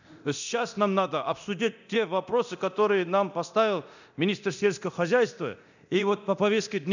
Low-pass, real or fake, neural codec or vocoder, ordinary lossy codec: 7.2 kHz; fake; codec, 24 kHz, 0.9 kbps, DualCodec; none